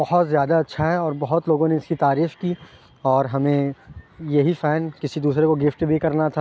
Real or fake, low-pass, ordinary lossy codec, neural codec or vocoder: real; none; none; none